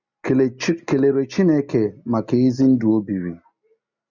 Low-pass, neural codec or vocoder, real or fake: 7.2 kHz; none; real